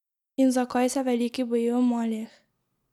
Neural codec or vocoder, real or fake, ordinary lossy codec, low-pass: none; real; none; 19.8 kHz